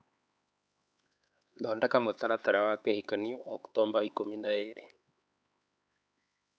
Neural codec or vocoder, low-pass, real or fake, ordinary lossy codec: codec, 16 kHz, 4 kbps, X-Codec, HuBERT features, trained on LibriSpeech; none; fake; none